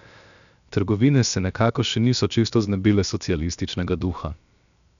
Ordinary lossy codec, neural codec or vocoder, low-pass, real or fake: none; codec, 16 kHz, 0.7 kbps, FocalCodec; 7.2 kHz; fake